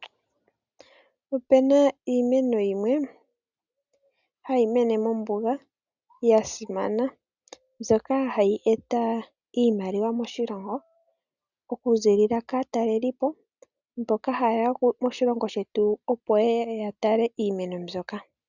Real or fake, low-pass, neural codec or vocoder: real; 7.2 kHz; none